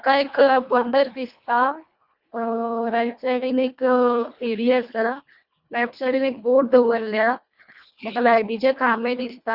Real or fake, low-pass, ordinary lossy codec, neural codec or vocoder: fake; 5.4 kHz; Opus, 64 kbps; codec, 24 kHz, 1.5 kbps, HILCodec